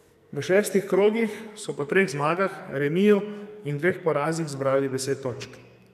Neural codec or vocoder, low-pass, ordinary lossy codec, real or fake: codec, 44.1 kHz, 2.6 kbps, SNAC; 14.4 kHz; none; fake